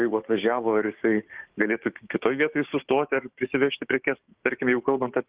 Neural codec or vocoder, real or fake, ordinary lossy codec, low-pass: codec, 16 kHz, 6 kbps, DAC; fake; Opus, 16 kbps; 3.6 kHz